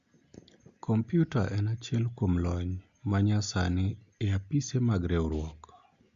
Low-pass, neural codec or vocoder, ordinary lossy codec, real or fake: 7.2 kHz; none; Opus, 64 kbps; real